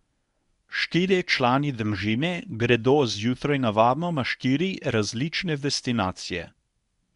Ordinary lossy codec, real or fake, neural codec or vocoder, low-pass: MP3, 96 kbps; fake; codec, 24 kHz, 0.9 kbps, WavTokenizer, medium speech release version 1; 10.8 kHz